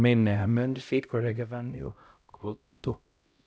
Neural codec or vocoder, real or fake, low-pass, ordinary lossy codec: codec, 16 kHz, 0.5 kbps, X-Codec, HuBERT features, trained on LibriSpeech; fake; none; none